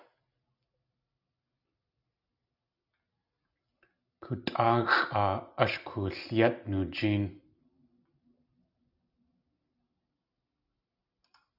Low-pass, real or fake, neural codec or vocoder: 5.4 kHz; real; none